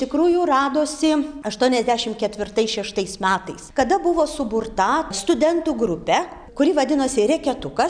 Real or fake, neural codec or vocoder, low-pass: real; none; 9.9 kHz